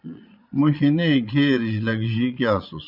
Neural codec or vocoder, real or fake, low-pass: vocoder, 22.05 kHz, 80 mel bands, Vocos; fake; 5.4 kHz